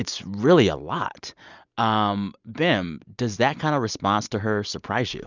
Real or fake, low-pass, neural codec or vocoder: real; 7.2 kHz; none